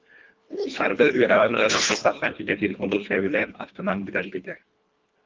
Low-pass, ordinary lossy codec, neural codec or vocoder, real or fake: 7.2 kHz; Opus, 24 kbps; codec, 24 kHz, 1.5 kbps, HILCodec; fake